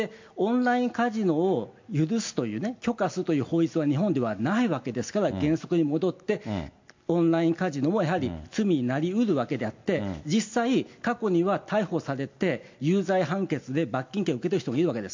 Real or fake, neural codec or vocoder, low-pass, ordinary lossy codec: real; none; 7.2 kHz; MP3, 48 kbps